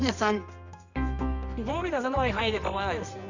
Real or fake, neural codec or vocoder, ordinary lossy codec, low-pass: fake; codec, 24 kHz, 0.9 kbps, WavTokenizer, medium music audio release; none; 7.2 kHz